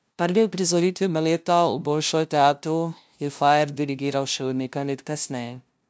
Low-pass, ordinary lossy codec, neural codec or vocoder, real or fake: none; none; codec, 16 kHz, 0.5 kbps, FunCodec, trained on LibriTTS, 25 frames a second; fake